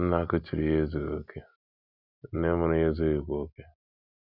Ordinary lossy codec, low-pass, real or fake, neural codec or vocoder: none; 5.4 kHz; real; none